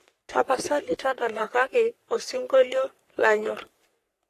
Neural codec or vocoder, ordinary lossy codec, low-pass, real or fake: codec, 44.1 kHz, 3.4 kbps, Pupu-Codec; AAC, 48 kbps; 14.4 kHz; fake